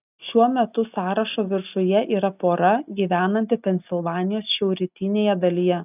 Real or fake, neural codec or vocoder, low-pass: real; none; 3.6 kHz